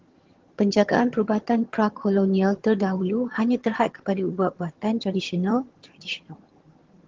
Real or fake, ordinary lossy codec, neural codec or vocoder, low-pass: fake; Opus, 16 kbps; vocoder, 22.05 kHz, 80 mel bands, HiFi-GAN; 7.2 kHz